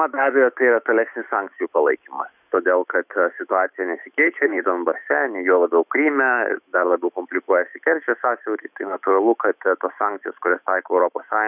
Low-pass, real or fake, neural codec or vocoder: 3.6 kHz; fake; autoencoder, 48 kHz, 128 numbers a frame, DAC-VAE, trained on Japanese speech